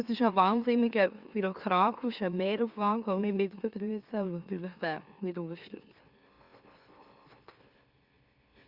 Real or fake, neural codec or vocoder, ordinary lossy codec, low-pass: fake; autoencoder, 44.1 kHz, a latent of 192 numbers a frame, MeloTTS; Opus, 64 kbps; 5.4 kHz